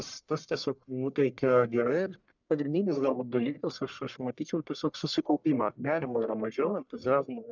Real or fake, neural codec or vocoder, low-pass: fake; codec, 44.1 kHz, 1.7 kbps, Pupu-Codec; 7.2 kHz